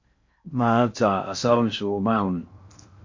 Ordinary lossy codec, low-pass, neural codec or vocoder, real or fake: MP3, 48 kbps; 7.2 kHz; codec, 16 kHz in and 24 kHz out, 0.6 kbps, FocalCodec, streaming, 4096 codes; fake